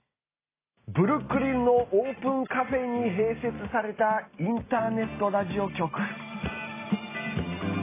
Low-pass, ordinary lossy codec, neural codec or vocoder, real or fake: 3.6 kHz; AAC, 16 kbps; none; real